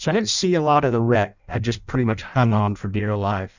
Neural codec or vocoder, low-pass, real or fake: codec, 16 kHz in and 24 kHz out, 0.6 kbps, FireRedTTS-2 codec; 7.2 kHz; fake